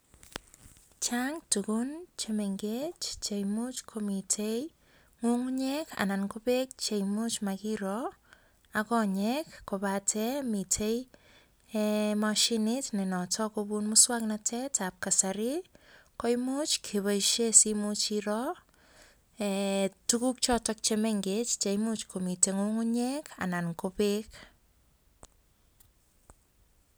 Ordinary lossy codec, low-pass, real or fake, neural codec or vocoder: none; none; real; none